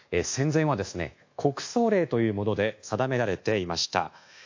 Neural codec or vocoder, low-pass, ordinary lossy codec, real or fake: codec, 24 kHz, 1.2 kbps, DualCodec; 7.2 kHz; AAC, 48 kbps; fake